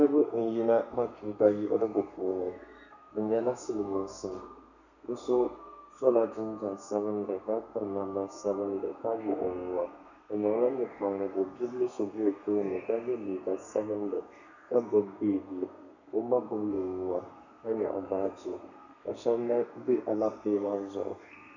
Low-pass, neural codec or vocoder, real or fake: 7.2 kHz; codec, 32 kHz, 1.9 kbps, SNAC; fake